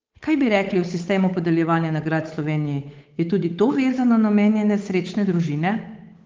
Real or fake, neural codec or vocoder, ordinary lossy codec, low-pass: fake; codec, 16 kHz, 8 kbps, FunCodec, trained on Chinese and English, 25 frames a second; Opus, 24 kbps; 7.2 kHz